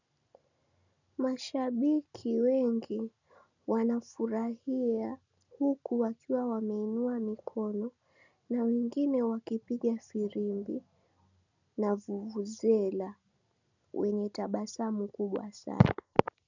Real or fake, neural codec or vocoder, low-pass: real; none; 7.2 kHz